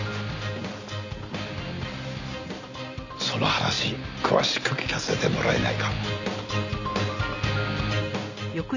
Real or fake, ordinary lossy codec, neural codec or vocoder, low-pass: real; none; none; 7.2 kHz